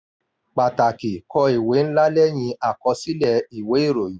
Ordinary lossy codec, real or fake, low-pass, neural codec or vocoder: none; real; none; none